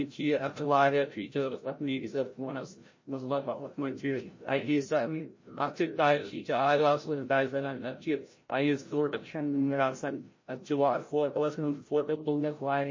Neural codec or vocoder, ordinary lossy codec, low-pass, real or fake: codec, 16 kHz, 0.5 kbps, FreqCodec, larger model; MP3, 32 kbps; 7.2 kHz; fake